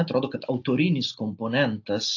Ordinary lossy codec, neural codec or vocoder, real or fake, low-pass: AAC, 48 kbps; none; real; 7.2 kHz